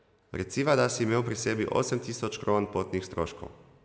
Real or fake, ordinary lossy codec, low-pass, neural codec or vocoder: real; none; none; none